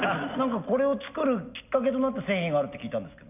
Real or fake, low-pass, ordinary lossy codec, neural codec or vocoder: real; 3.6 kHz; none; none